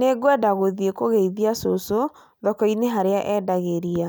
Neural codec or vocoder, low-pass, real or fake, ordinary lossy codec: none; none; real; none